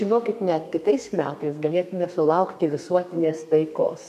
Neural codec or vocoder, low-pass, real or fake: codec, 32 kHz, 1.9 kbps, SNAC; 14.4 kHz; fake